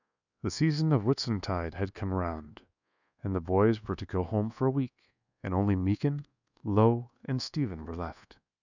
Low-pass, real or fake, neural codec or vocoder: 7.2 kHz; fake; codec, 24 kHz, 1.2 kbps, DualCodec